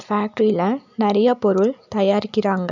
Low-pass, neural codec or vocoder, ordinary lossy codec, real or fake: 7.2 kHz; none; none; real